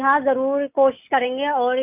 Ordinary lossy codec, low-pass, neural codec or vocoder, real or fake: none; 3.6 kHz; none; real